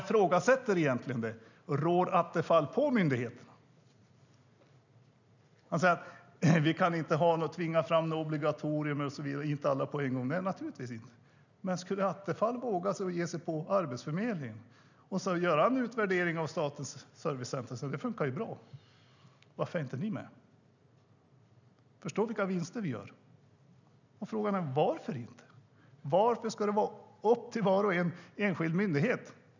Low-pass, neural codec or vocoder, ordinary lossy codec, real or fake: 7.2 kHz; none; none; real